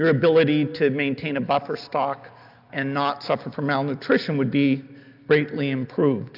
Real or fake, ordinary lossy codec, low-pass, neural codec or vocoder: real; AAC, 48 kbps; 5.4 kHz; none